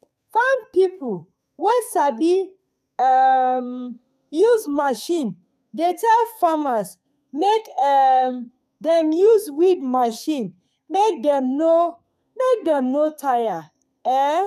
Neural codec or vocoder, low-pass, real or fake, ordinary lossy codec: codec, 32 kHz, 1.9 kbps, SNAC; 14.4 kHz; fake; none